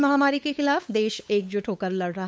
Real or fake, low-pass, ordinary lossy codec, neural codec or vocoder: fake; none; none; codec, 16 kHz, 8 kbps, FunCodec, trained on LibriTTS, 25 frames a second